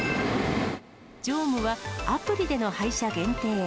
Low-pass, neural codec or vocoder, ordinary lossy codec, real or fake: none; none; none; real